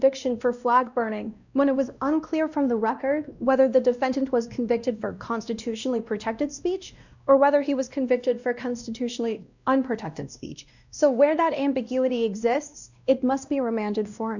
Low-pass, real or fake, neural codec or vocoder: 7.2 kHz; fake; codec, 16 kHz, 1 kbps, X-Codec, WavLM features, trained on Multilingual LibriSpeech